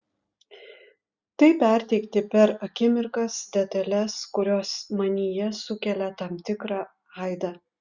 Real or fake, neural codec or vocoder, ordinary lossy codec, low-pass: real; none; Opus, 64 kbps; 7.2 kHz